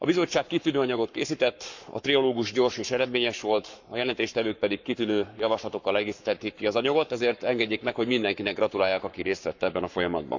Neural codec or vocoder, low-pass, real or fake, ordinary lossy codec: codec, 44.1 kHz, 7.8 kbps, Pupu-Codec; 7.2 kHz; fake; none